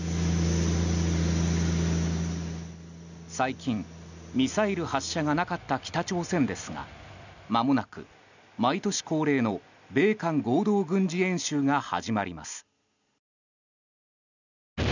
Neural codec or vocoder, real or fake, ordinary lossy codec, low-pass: none; real; none; 7.2 kHz